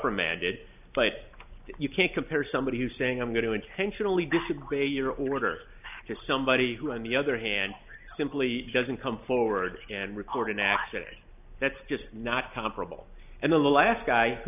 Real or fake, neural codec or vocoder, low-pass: real; none; 3.6 kHz